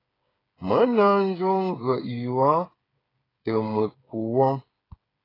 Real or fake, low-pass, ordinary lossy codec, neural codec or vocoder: fake; 5.4 kHz; AAC, 24 kbps; codec, 16 kHz, 8 kbps, FreqCodec, smaller model